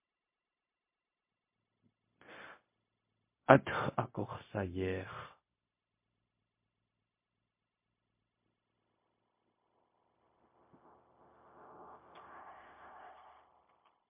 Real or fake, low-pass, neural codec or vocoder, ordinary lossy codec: fake; 3.6 kHz; codec, 16 kHz, 0.4 kbps, LongCat-Audio-Codec; MP3, 24 kbps